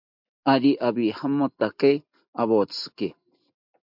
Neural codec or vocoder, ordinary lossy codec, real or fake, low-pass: none; MP3, 48 kbps; real; 5.4 kHz